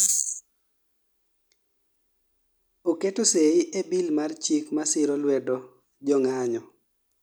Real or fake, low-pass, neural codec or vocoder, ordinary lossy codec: real; none; none; none